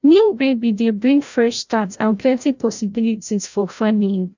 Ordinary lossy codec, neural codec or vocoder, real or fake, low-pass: none; codec, 16 kHz, 0.5 kbps, FreqCodec, larger model; fake; 7.2 kHz